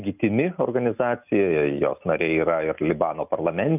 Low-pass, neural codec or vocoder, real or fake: 3.6 kHz; none; real